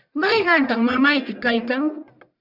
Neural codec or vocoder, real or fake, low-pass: codec, 44.1 kHz, 1.7 kbps, Pupu-Codec; fake; 5.4 kHz